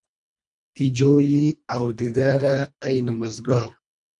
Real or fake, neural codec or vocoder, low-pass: fake; codec, 24 kHz, 1.5 kbps, HILCodec; 10.8 kHz